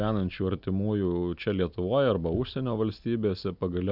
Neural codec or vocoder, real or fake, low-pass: none; real; 5.4 kHz